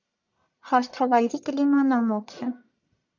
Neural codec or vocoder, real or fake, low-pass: codec, 44.1 kHz, 1.7 kbps, Pupu-Codec; fake; 7.2 kHz